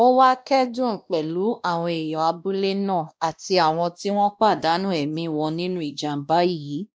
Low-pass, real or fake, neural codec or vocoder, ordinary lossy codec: none; fake; codec, 16 kHz, 1 kbps, X-Codec, WavLM features, trained on Multilingual LibriSpeech; none